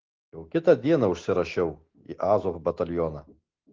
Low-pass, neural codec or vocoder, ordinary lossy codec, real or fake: 7.2 kHz; none; Opus, 16 kbps; real